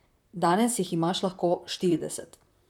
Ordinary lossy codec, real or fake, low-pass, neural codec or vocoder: none; fake; 19.8 kHz; vocoder, 44.1 kHz, 128 mel bands, Pupu-Vocoder